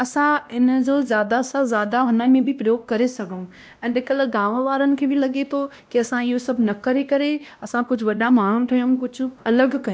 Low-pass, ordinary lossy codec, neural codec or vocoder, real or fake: none; none; codec, 16 kHz, 1 kbps, X-Codec, WavLM features, trained on Multilingual LibriSpeech; fake